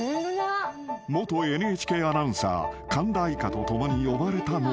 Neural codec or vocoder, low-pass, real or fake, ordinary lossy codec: none; none; real; none